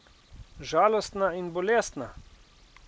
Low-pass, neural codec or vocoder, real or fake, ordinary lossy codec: none; none; real; none